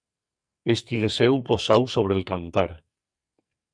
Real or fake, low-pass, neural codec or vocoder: fake; 9.9 kHz; codec, 44.1 kHz, 2.6 kbps, SNAC